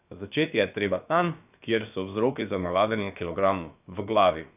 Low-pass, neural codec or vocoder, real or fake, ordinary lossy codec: 3.6 kHz; codec, 16 kHz, about 1 kbps, DyCAST, with the encoder's durations; fake; none